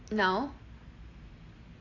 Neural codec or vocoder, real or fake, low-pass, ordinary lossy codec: none; real; 7.2 kHz; AAC, 32 kbps